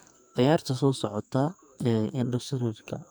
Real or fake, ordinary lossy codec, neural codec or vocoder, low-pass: fake; none; codec, 44.1 kHz, 2.6 kbps, SNAC; none